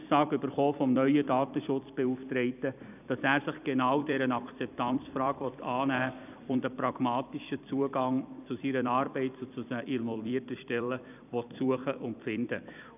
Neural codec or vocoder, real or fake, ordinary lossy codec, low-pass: vocoder, 44.1 kHz, 128 mel bands every 256 samples, BigVGAN v2; fake; none; 3.6 kHz